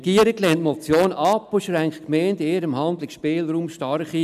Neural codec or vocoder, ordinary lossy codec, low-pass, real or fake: none; none; 14.4 kHz; real